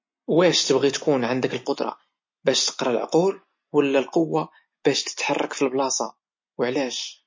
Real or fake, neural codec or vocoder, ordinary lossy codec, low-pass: real; none; MP3, 32 kbps; 7.2 kHz